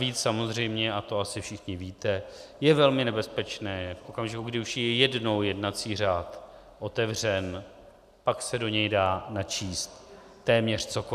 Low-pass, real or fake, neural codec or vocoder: 14.4 kHz; real; none